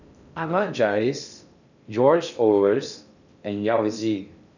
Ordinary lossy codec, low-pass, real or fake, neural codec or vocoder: none; 7.2 kHz; fake; codec, 16 kHz in and 24 kHz out, 0.6 kbps, FocalCodec, streaming, 2048 codes